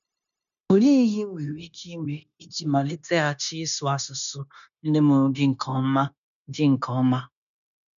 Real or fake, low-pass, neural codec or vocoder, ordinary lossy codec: fake; 7.2 kHz; codec, 16 kHz, 0.9 kbps, LongCat-Audio-Codec; none